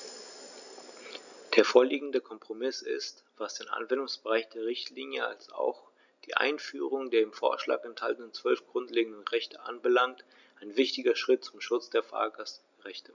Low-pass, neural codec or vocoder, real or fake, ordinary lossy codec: 7.2 kHz; none; real; none